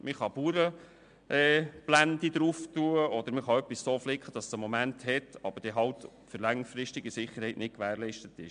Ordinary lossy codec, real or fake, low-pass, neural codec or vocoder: MP3, 64 kbps; real; 9.9 kHz; none